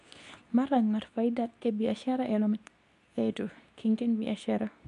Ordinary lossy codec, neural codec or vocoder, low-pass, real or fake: none; codec, 24 kHz, 0.9 kbps, WavTokenizer, medium speech release version 2; 10.8 kHz; fake